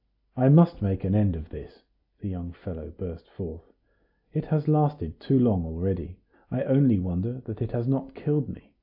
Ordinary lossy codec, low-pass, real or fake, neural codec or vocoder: AAC, 48 kbps; 5.4 kHz; real; none